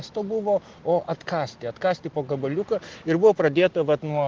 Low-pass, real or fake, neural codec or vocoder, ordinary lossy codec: 7.2 kHz; real; none; Opus, 16 kbps